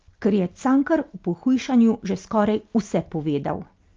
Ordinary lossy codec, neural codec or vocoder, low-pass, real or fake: Opus, 24 kbps; none; 7.2 kHz; real